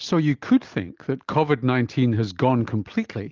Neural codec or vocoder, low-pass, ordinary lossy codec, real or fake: none; 7.2 kHz; Opus, 24 kbps; real